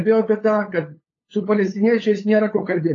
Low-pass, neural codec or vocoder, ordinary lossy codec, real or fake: 7.2 kHz; codec, 16 kHz, 8 kbps, FunCodec, trained on LibriTTS, 25 frames a second; AAC, 32 kbps; fake